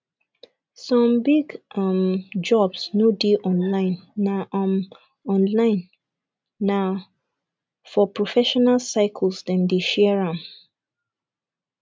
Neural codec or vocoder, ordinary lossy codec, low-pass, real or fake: none; none; none; real